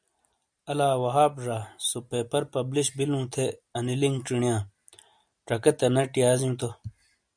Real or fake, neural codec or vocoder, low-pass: real; none; 9.9 kHz